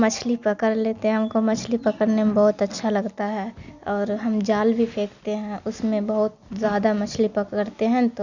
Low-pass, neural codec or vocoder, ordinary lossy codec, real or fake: 7.2 kHz; none; none; real